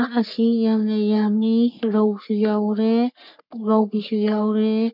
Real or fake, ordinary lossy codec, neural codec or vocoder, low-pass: fake; none; codec, 44.1 kHz, 3.4 kbps, Pupu-Codec; 5.4 kHz